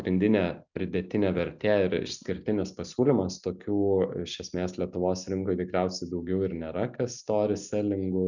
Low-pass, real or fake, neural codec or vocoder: 7.2 kHz; real; none